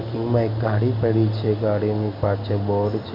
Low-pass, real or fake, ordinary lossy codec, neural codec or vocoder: 5.4 kHz; real; MP3, 32 kbps; none